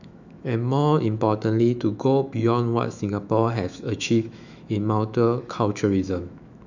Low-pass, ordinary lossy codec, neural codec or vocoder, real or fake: 7.2 kHz; none; autoencoder, 48 kHz, 128 numbers a frame, DAC-VAE, trained on Japanese speech; fake